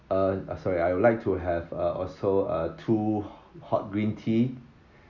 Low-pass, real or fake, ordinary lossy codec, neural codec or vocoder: 7.2 kHz; real; none; none